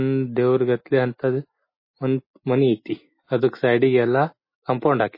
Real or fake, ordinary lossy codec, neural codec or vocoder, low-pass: real; MP3, 24 kbps; none; 5.4 kHz